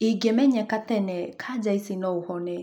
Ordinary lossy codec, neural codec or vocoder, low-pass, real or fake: none; none; 19.8 kHz; real